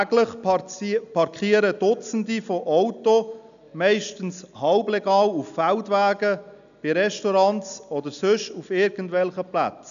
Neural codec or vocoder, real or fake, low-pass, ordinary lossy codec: none; real; 7.2 kHz; none